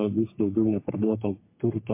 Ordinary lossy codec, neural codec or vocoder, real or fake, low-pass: MP3, 24 kbps; codec, 44.1 kHz, 3.4 kbps, Pupu-Codec; fake; 3.6 kHz